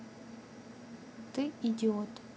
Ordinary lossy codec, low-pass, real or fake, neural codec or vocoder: none; none; real; none